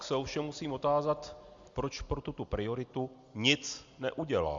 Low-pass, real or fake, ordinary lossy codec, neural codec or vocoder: 7.2 kHz; real; Opus, 64 kbps; none